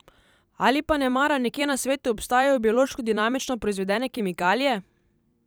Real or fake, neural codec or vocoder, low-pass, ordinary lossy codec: fake; vocoder, 44.1 kHz, 128 mel bands every 256 samples, BigVGAN v2; none; none